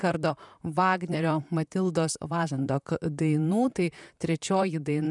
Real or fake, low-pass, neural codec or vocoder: fake; 10.8 kHz; vocoder, 44.1 kHz, 128 mel bands, Pupu-Vocoder